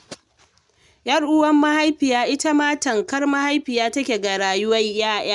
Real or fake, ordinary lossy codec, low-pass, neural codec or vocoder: real; none; 10.8 kHz; none